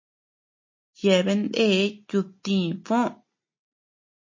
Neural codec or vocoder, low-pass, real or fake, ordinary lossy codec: none; 7.2 kHz; real; MP3, 32 kbps